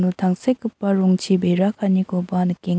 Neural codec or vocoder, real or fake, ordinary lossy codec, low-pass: none; real; none; none